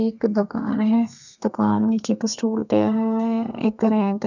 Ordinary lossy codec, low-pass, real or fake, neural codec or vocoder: none; 7.2 kHz; fake; codec, 32 kHz, 1.9 kbps, SNAC